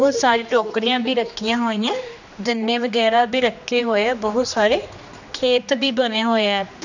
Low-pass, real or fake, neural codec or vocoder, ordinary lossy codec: 7.2 kHz; fake; codec, 16 kHz, 2 kbps, X-Codec, HuBERT features, trained on general audio; none